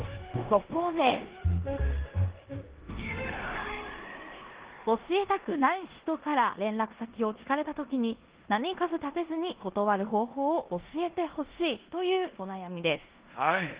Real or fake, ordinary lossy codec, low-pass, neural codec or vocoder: fake; Opus, 16 kbps; 3.6 kHz; codec, 16 kHz in and 24 kHz out, 0.9 kbps, LongCat-Audio-Codec, four codebook decoder